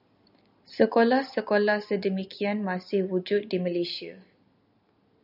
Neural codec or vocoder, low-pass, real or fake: none; 5.4 kHz; real